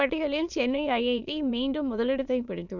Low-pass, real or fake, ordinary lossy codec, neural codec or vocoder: 7.2 kHz; fake; none; autoencoder, 22.05 kHz, a latent of 192 numbers a frame, VITS, trained on many speakers